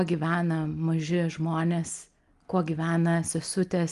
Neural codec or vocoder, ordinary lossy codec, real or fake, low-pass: none; Opus, 32 kbps; real; 10.8 kHz